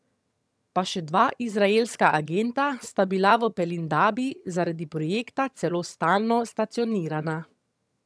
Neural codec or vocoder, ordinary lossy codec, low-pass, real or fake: vocoder, 22.05 kHz, 80 mel bands, HiFi-GAN; none; none; fake